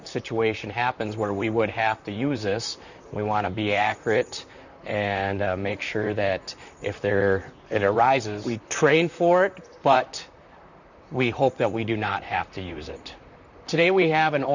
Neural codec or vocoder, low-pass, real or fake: vocoder, 44.1 kHz, 128 mel bands, Pupu-Vocoder; 7.2 kHz; fake